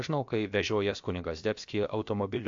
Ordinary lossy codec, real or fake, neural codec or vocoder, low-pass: MP3, 48 kbps; fake; codec, 16 kHz, about 1 kbps, DyCAST, with the encoder's durations; 7.2 kHz